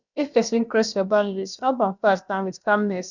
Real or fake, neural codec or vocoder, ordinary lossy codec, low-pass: fake; codec, 16 kHz, about 1 kbps, DyCAST, with the encoder's durations; none; 7.2 kHz